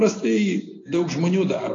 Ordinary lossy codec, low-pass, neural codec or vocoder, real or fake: AAC, 32 kbps; 7.2 kHz; none; real